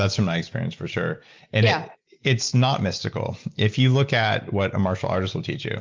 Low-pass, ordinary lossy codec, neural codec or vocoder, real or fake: 7.2 kHz; Opus, 32 kbps; none; real